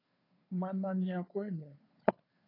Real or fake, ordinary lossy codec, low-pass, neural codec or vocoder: fake; AAC, 24 kbps; 5.4 kHz; codec, 16 kHz, 2 kbps, FunCodec, trained on Chinese and English, 25 frames a second